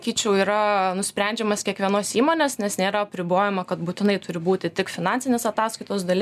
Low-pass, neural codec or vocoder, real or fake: 14.4 kHz; none; real